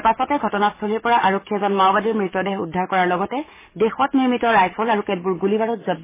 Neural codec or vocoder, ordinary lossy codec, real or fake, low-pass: codec, 44.1 kHz, 7.8 kbps, DAC; MP3, 16 kbps; fake; 3.6 kHz